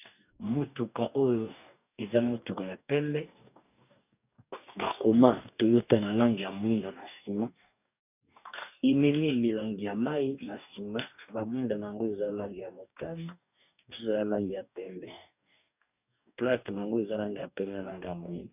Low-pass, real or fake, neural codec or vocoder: 3.6 kHz; fake; codec, 44.1 kHz, 2.6 kbps, DAC